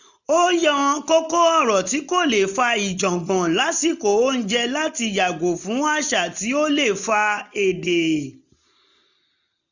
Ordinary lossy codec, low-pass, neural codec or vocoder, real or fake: none; 7.2 kHz; none; real